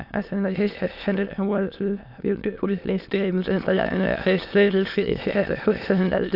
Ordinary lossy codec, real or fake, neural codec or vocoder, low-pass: AAC, 48 kbps; fake; autoencoder, 22.05 kHz, a latent of 192 numbers a frame, VITS, trained on many speakers; 5.4 kHz